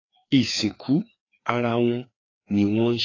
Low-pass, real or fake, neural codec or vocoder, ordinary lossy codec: 7.2 kHz; fake; codec, 16 kHz, 2 kbps, FreqCodec, larger model; AAC, 32 kbps